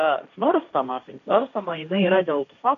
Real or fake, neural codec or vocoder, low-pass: fake; codec, 16 kHz, 1.1 kbps, Voila-Tokenizer; 7.2 kHz